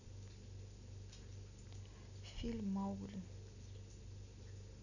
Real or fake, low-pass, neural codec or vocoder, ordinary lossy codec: real; 7.2 kHz; none; none